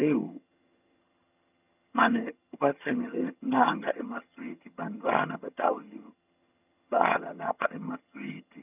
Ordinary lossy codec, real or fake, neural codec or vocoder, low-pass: none; fake; vocoder, 22.05 kHz, 80 mel bands, HiFi-GAN; 3.6 kHz